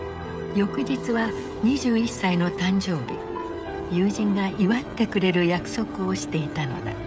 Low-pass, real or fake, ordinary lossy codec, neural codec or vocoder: none; fake; none; codec, 16 kHz, 16 kbps, FreqCodec, smaller model